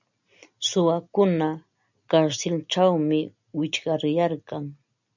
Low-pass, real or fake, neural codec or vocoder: 7.2 kHz; real; none